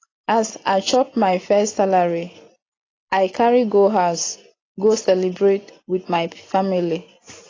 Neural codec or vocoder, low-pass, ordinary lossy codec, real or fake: none; 7.2 kHz; AAC, 32 kbps; real